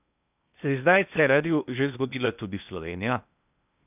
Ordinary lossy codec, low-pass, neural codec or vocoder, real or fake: none; 3.6 kHz; codec, 16 kHz in and 24 kHz out, 0.8 kbps, FocalCodec, streaming, 65536 codes; fake